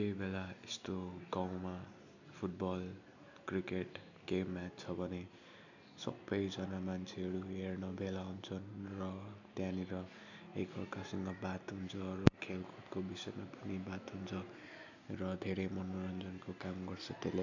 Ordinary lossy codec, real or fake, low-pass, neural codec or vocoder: none; real; 7.2 kHz; none